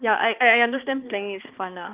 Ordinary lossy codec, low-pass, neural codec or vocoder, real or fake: Opus, 32 kbps; 3.6 kHz; codec, 16 kHz, 2 kbps, X-Codec, HuBERT features, trained on balanced general audio; fake